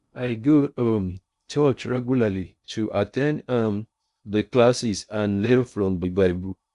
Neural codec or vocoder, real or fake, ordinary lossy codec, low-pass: codec, 16 kHz in and 24 kHz out, 0.6 kbps, FocalCodec, streaming, 2048 codes; fake; Opus, 64 kbps; 10.8 kHz